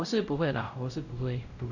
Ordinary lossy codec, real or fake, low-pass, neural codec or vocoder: none; fake; 7.2 kHz; codec, 16 kHz, 0.5 kbps, X-Codec, WavLM features, trained on Multilingual LibriSpeech